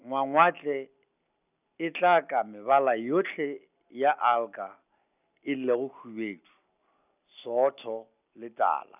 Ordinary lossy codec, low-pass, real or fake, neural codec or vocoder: none; 3.6 kHz; real; none